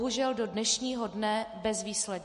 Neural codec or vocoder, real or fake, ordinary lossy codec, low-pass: none; real; MP3, 48 kbps; 14.4 kHz